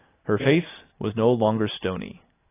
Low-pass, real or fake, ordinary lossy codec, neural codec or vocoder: 3.6 kHz; real; AAC, 16 kbps; none